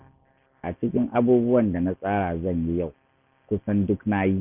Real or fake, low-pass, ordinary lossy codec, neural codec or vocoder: real; 3.6 kHz; none; none